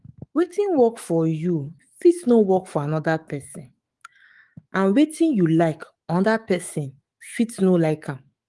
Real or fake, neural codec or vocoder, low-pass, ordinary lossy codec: fake; autoencoder, 48 kHz, 128 numbers a frame, DAC-VAE, trained on Japanese speech; 10.8 kHz; Opus, 24 kbps